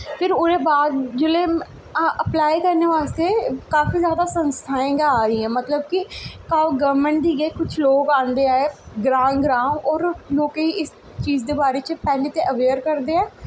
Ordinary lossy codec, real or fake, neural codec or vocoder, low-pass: none; real; none; none